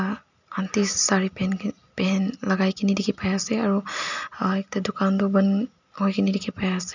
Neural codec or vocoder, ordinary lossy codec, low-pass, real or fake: none; none; 7.2 kHz; real